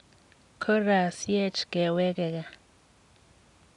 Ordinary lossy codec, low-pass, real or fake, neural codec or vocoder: none; 10.8 kHz; real; none